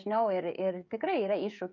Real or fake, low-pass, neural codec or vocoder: fake; 7.2 kHz; codec, 16 kHz, 16 kbps, FreqCodec, smaller model